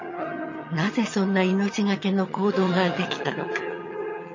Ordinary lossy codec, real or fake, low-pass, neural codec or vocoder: MP3, 32 kbps; fake; 7.2 kHz; vocoder, 22.05 kHz, 80 mel bands, HiFi-GAN